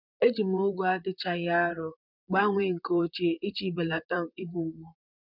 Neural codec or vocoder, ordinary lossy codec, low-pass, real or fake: vocoder, 44.1 kHz, 128 mel bands, Pupu-Vocoder; none; 5.4 kHz; fake